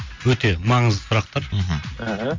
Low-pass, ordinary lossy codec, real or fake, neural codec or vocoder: 7.2 kHz; MP3, 48 kbps; real; none